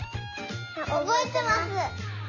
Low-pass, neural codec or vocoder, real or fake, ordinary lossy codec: 7.2 kHz; none; real; AAC, 48 kbps